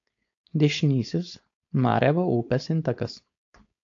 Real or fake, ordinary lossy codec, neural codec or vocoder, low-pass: fake; AAC, 48 kbps; codec, 16 kHz, 4.8 kbps, FACodec; 7.2 kHz